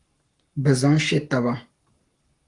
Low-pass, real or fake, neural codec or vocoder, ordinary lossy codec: 10.8 kHz; fake; codec, 44.1 kHz, 7.8 kbps, Pupu-Codec; Opus, 32 kbps